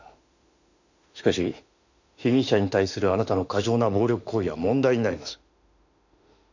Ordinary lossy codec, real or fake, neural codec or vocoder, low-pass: none; fake; autoencoder, 48 kHz, 32 numbers a frame, DAC-VAE, trained on Japanese speech; 7.2 kHz